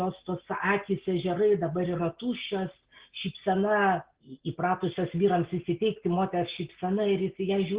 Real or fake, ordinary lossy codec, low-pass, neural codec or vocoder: real; Opus, 16 kbps; 3.6 kHz; none